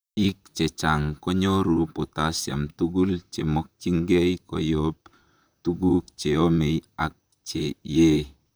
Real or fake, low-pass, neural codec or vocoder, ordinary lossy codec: fake; none; vocoder, 44.1 kHz, 128 mel bands, Pupu-Vocoder; none